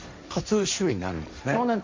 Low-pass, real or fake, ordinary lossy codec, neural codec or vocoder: 7.2 kHz; fake; MP3, 64 kbps; codec, 16 kHz, 1.1 kbps, Voila-Tokenizer